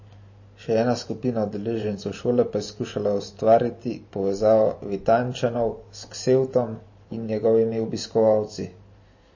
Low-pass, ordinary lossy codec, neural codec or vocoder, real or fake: 7.2 kHz; MP3, 32 kbps; vocoder, 44.1 kHz, 128 mel bands every 512 samples, BigVGAN v2; fake